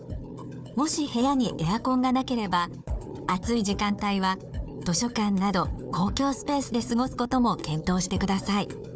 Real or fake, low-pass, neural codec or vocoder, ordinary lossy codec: fake; none; codec, 16 kHz, 4 kbps, FunCodec, trained on Chinese and English, 50 frames a second; none